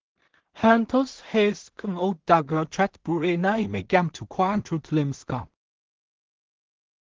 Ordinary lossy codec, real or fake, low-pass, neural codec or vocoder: Opus, 16 kbps; fake; 7.2 kHz; codec, 16 kHz in and 24 kHz out, 0.4 kbps, LongCat-Audio-Codec, two codebook decoder